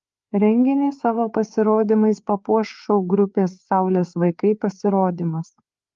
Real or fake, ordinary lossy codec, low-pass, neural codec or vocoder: fake; Opus, 32 kbps; 7.2 kHz; codec, 16 kHz, 4 kbps, FreqCodec, larger model